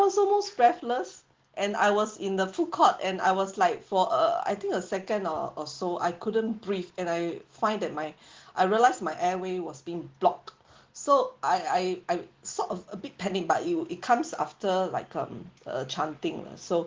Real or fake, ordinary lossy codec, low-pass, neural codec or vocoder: real; Opus, 16 kbps; 7.2 kHz; none